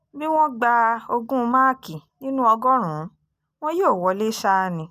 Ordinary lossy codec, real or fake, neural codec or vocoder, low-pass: none; real; none; 14.4 kHz